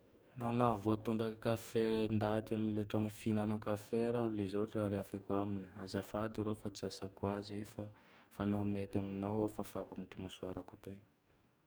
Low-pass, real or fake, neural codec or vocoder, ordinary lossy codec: none; fake; codec, 44.1 kHz, 2.6 kbps, DAC; none